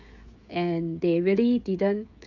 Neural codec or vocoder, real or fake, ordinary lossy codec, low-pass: codec, 16 kHz, 16 kbps, FreqCodec, smaller model; fake; none; 7.2 kHz